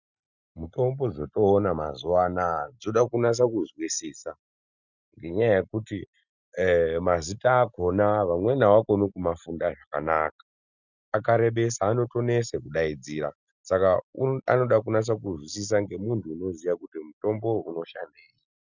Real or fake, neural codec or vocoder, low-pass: real; none; 7.2 kHz